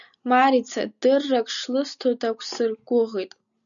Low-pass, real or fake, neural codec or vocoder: 7.2 kHz; real; none